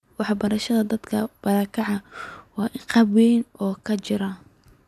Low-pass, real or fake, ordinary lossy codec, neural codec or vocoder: 14.4 kHz; real; none; none